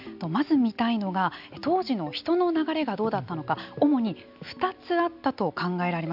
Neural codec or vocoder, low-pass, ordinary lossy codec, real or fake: none; 5.4 kHz; AAC, 48 kbps; real